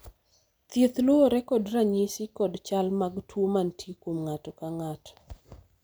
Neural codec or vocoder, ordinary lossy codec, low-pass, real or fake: none; none; none; real